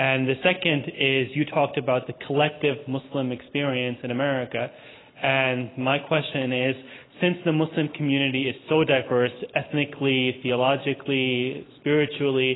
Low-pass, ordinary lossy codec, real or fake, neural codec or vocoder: 7.2 kHz; AAC, 16 kbps; real; none